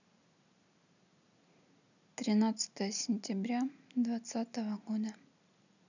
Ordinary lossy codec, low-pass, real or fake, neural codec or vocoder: none; 7.2 kHz; real; none